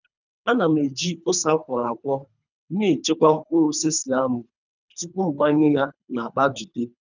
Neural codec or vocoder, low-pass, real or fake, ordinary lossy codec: codec, 24 kHz, 3 kbps, HILCodec; 7.2 kHz; fake; none